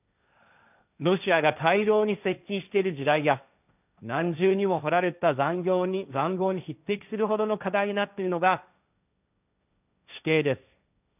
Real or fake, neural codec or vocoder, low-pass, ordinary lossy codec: fake; codec, 16 kHz, 1.1 kbps, Voila-Tokenizer; 3.6 kHz; none